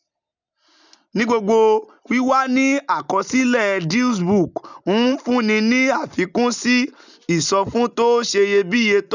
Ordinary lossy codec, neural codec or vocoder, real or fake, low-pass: none; none; real; 7.2 kHz